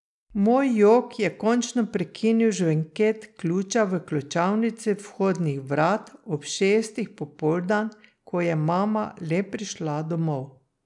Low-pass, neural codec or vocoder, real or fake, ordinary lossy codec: 10.8 kHz; none; real; none